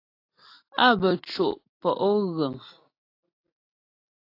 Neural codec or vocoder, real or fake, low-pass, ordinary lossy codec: none; real; 5.4 kHz; AAC, 32 kbps